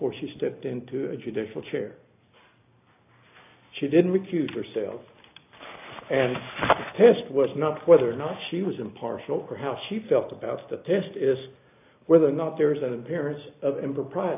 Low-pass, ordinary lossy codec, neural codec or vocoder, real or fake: 3.6 kHz; AAC, 32 kbps; none; real